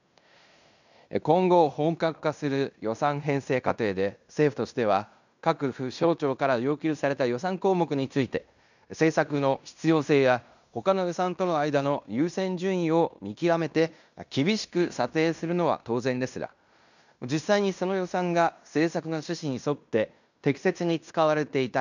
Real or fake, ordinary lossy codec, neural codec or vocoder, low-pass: fake; none; codec, 16 kHz in and 24 kHz out, 0.9 kbps, LongCat-Audio-Codec, fine tuned four codebook decoder; 7.2 kHz